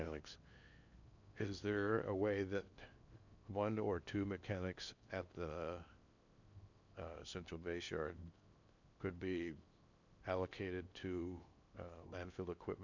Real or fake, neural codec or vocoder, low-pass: fake; codec, 16 kHz in and 24 kHz out, 0.8 kbps, FocalCodec, streaming, 65536 codes; 7.2 kHz